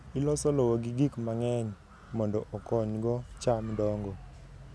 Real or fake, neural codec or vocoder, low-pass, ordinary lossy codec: real; none; none; none